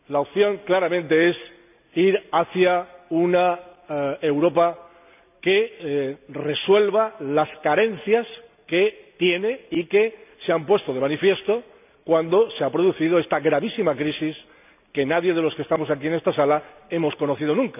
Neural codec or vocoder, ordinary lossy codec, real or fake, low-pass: none; none; real; 3.6 kHz